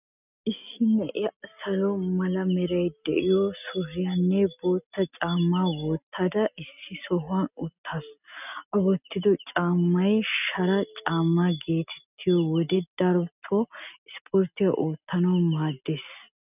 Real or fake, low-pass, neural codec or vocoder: real; 3.6 kHz; none